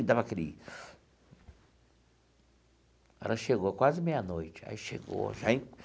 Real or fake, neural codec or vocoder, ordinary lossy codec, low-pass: real; none; none; none